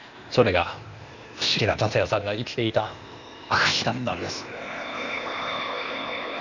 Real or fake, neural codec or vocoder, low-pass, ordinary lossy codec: fake; codec, 16 kHz, 0.8 kbps, ZipCodec; 7.2 kHz; none